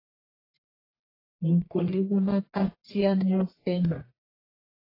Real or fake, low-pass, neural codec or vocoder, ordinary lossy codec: fake; 5.4 kHz; codec, 44.1 kHz, 1.7 kbps, Pupu-Codec; AAC, 24 kbps